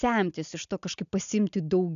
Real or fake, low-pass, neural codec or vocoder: real; 7.2 kHz; none